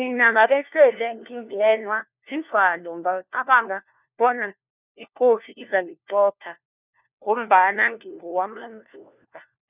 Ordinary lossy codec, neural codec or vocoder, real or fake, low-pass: none; codec, 16 kHz, 1 kbps, FunCodec, trained on LibriTTS, 50 frames a second; fake; 3.6 kHz